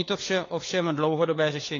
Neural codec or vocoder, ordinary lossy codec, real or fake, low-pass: codec, 16 kHz, 4 kbps, FunCodec, trained on LibriTTS, 50 frames a second; AAC, 32 kbps; fake; 7.2 kHz